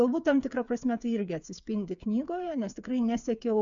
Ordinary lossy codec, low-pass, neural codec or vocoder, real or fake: MP3, 64 kbps; 7.2 kHz; codec, 16 kHz, 8 kbps, FreqCodec, smaller model; fake